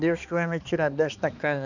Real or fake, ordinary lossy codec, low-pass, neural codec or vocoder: fake; none; 7.2 kHz; codec, 16 kHz, 2 kbps, X-Codec, HuBERT features, trained on balanced general audio